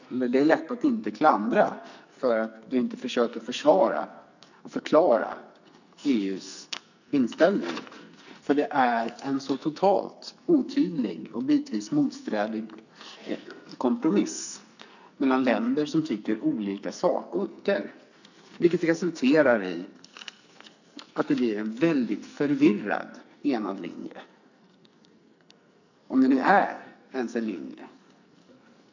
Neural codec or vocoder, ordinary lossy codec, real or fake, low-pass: codec, 44.1 kHz, 2.6 kbps, SNAC; none; fake; 7.2 kHz